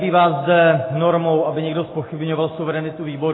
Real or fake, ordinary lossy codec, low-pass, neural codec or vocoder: real; AAC, 16 kbps; 7.2 kHz; none